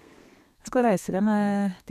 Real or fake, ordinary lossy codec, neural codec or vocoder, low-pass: fake; none; codec, 32 kHz, 1.9 kbps, SNAC; 14.4 kHz